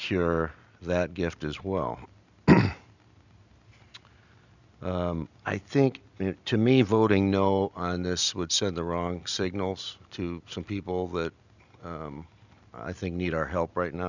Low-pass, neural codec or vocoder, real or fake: 7.2 kHz; none; real